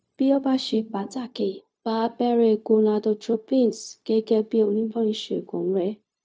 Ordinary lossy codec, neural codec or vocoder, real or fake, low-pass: none; codec, 16 kHz, 0.4 kbps, LongCat-Audio-Codec; fake; none